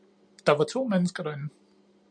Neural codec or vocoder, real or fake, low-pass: none; real; 9.9 kHz